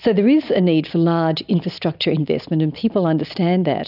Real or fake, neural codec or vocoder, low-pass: real; none; 5.4 kHz